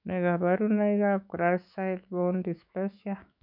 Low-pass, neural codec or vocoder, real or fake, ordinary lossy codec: 5.4 kHz; autoencoder, 48 kHz, 128 numbers a frame, DAC-VAE, trained on Japanese speech; fake; none